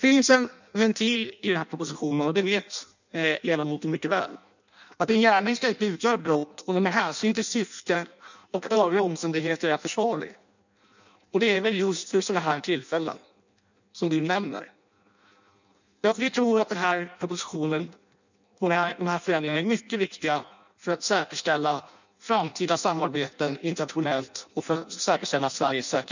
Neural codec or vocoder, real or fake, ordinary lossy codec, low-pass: codec, 16 kHz in and 24 kHz out, 0.6 kbps, FireRedTTS-2 codec; fake; none; 7.2 kHz